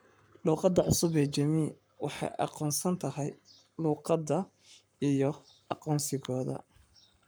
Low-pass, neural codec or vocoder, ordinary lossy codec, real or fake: none; codec, 44.1 kHz, 7.8 kbps, Pupu-Codec; none; fake